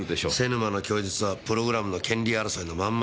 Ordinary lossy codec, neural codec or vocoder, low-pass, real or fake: none; none; none; real